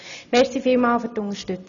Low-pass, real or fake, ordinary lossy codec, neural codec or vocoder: 7.2 kHz; real; none; none